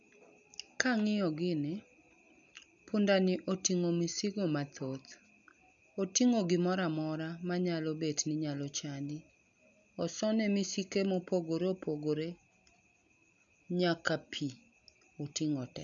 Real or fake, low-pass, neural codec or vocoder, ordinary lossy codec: real; 7.2 kHz; none; none